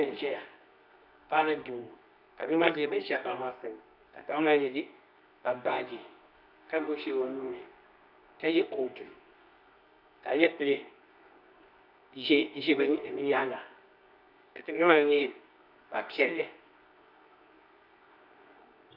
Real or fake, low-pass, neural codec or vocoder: fake; 5.4 kHz; codec, 24 kHz, 0.9 kbps, WavTokenizer, medium music audio release